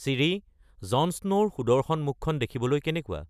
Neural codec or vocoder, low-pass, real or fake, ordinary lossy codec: none; 14.4 kHz; real; none